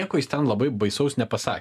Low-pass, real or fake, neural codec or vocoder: 14.4 kHz; real; none